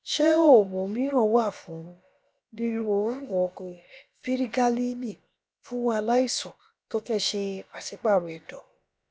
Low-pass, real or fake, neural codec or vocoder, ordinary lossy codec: none; fake; codec, 16 kHz, about 1 kbps, DyCAST, with the encoder's durations; none